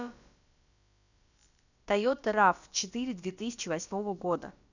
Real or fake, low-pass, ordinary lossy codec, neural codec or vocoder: fake; 7.2 kHz; none; codec, 16 kHz, about 1 kbps, DyCAST, with the encoder's durations